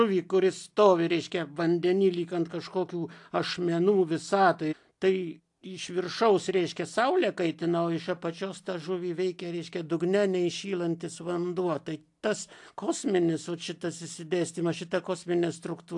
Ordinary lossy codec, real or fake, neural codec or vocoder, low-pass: AAC, 64 kbps; real; none; 10.8 kHz